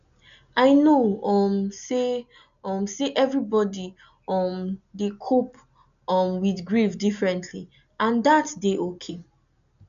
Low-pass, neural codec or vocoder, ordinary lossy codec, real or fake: 7.2 kHz; none; none; real